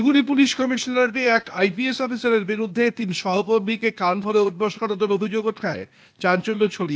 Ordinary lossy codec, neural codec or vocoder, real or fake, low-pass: none; codec, 16 kHz, 0.8 kbps, ZipCodec; fake; none